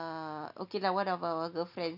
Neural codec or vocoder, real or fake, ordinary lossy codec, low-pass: none; real; none; 5.4 kHz